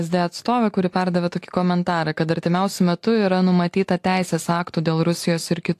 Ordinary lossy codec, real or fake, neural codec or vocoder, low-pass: AAC, 64 kbps; real; none; 14.4 kHz